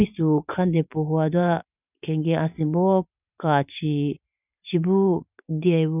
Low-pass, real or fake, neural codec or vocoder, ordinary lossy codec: 3.6 kHz; fake; vocoder, 44.1 kHz, 128 mel bands every 512 samples, BigVGAN v2; none